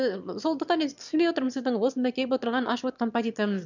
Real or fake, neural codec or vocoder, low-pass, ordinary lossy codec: fake; autoencoder, 22.05 kHz, a latent of 192 numbers a frame, VITS, trained on one speaker; 7.2 kHz; none